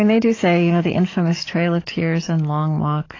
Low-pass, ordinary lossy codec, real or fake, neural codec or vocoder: 7.2 kHz; AAC, 32 kbps; fake; codec, 44.1 kHz, 7.8 kbps, DAC